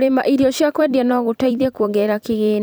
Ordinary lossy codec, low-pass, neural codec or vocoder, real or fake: none; none; vocoder, 44.1 kHz, 128 mel bands every 256 samples, BigVGAN v2; fake